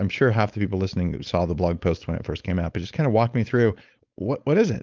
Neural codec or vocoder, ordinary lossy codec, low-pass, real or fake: codec, 16 kHz, 4.8 kbps, FACodec; Opus, 32 kbps; 7.2 kHz; fake